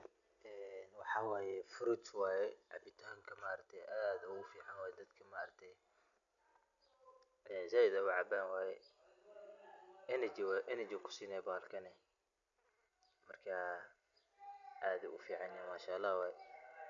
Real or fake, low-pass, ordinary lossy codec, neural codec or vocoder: real; 7.2 kHz; none; none